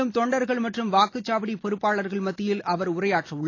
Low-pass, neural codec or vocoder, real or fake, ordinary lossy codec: 7.2 kHz; none; real; AAC, 32 kbps